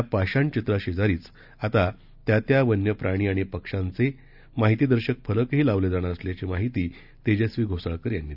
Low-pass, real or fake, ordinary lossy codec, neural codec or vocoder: 5.4 kHz; real; none; none